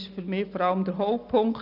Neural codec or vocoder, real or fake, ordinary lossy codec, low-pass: none; real; none; 5.4 kHz